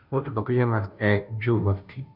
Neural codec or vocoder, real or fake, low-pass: codec, 16 kHz, 0.5 kbps, FunCodec, trained on Chinese and English, 25 frames a second; fake; 5.4 kHz